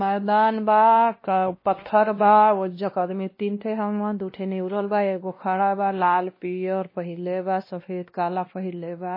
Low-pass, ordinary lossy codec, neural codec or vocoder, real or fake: 5.4 kHz; MP3, 24 kbps; codec, 16 kHz, 1 kbps, X-Codec, WavLM features, trained on Multilingual LibriSpeech; fake